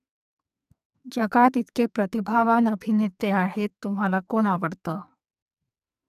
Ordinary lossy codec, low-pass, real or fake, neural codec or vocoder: none; 14.4 kHz; fake; codec, 44.1 kHz, 2.6 kbps, SNAC